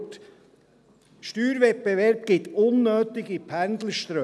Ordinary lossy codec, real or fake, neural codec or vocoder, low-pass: none; real; none; none